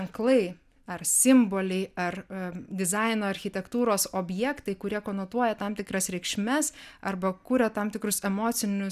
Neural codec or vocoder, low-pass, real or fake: none; 14.4 kHz; real